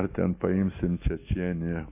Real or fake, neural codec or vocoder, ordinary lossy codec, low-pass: real; none; AAC, 24 kbps; 3.6 kHz